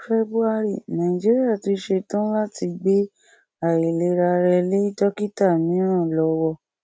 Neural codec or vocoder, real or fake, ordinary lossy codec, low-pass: none; real; none; none